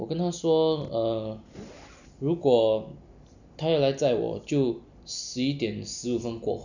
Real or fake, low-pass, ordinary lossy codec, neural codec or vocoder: real; 7.2 kHz; none; none